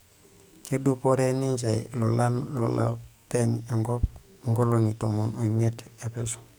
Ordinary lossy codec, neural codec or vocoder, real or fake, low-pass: none; codec, 44.1 kHz, 2.6 kbps, SNAC; fake; none